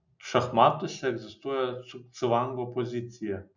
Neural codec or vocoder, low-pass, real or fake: none; 7.2 kHz; real